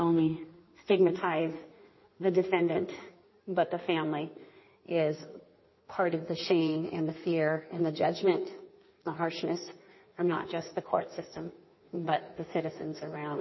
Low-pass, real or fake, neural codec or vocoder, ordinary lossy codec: 7.2 kHz; fake; codec, 16 kHz in and 24 kHz out, 1.1 kbps, FireRedTTS-2 codec; MP3, 24 kbps